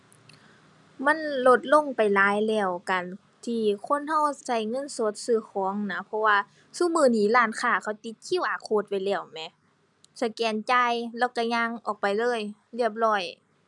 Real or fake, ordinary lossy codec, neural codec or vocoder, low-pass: real; none; none; 10.8 kHz